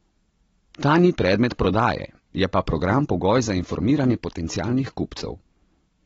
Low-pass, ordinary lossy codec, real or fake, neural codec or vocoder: 19.8 kHz; AAC, 24 kbps; real; none